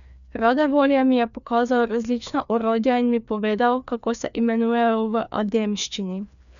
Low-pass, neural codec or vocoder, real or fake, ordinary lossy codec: 7.2 kHz; codec, 16 kHz, 2 kbps, FreqCodec, larger model; fake; none